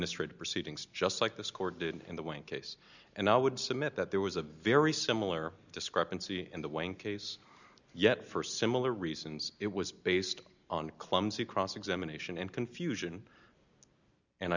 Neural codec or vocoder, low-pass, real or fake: none; 7.2 kHz; real